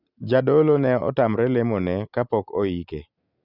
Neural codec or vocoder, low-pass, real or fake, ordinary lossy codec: none; 5.4 kHz; real; none